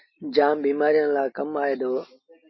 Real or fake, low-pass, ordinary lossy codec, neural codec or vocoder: real; 7.2 kHz; MP3, 24 kbps; none